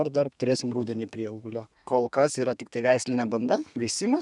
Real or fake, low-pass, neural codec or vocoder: fake; 10.8 kHz; codec, 32 kHz, 1.9 kbps, SNAC